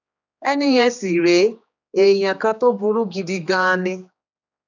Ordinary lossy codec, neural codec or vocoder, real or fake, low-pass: none; codec, 16 kHz, 2 kbps, X-Codec, HuBERT features, trained on general audio; fake; 7.2 kHz